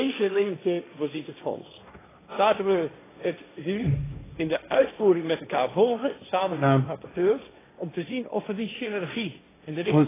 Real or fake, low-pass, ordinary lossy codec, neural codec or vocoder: fake; 3.6 kHz; AAC, 16 kbps; codec, 16 kHz, 1.1 kbps, Voila-Tokenizer